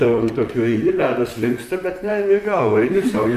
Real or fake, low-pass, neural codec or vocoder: fake; 14.4 kHz; vocoder, 44.1 kHz, 128 mel bands, Pupu-Vocoder